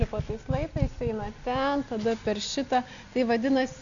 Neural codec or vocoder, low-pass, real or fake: none; 7.2 kHz; real